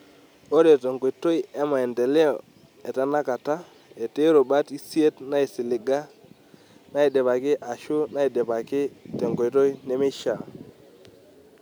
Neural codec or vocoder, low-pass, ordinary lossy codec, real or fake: none; none; none; real